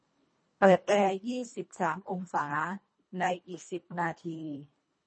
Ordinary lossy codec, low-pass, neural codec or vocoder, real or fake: MP3, 32 kbps; 9.9 kHz; codec, 24 kHz, 1.5 kbps, HILCodec; fake